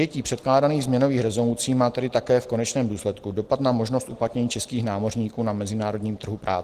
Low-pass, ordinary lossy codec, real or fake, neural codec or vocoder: 14.4 kHz; Opus, 16 kbps; real; none